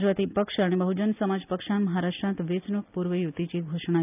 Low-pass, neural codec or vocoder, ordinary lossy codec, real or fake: 3.6 kHz; none; none; real